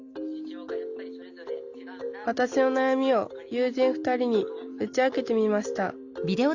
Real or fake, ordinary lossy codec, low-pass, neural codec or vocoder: real; Opus, 64 kbps; 7.2 kHz; none